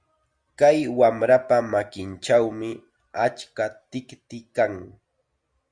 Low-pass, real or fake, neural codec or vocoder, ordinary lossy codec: 9.9 kHz; real; none; Opus, 64 kbps